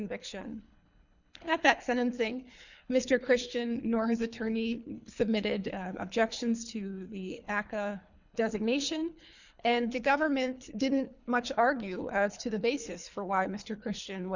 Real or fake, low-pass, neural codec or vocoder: fake; 7.2 kHz; codec, 24 kHz, 3 kbps, HILCodec